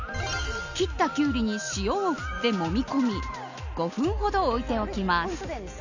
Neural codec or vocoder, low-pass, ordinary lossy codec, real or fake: none; 7.2 kHz; none; real